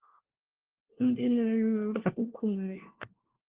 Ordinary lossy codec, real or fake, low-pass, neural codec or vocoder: Opus, 16 kbps; fake; 3.6 kHz; codec, 16 kHz, 1 kbps, FunCodec, trained on LibriTTS, 50 frames a second